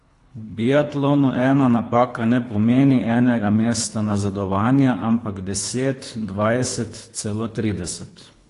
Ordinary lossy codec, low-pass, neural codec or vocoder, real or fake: AAC, 48 kbps; 10.8 kHz; codec, 24 kHz, 3 kbps, HILCodec; fake